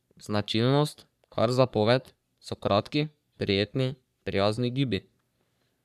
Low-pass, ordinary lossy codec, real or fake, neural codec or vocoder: 14.4 kHz; none; fake; codec, 44.1 kHz, 3.4 kbps, Pupu-Codec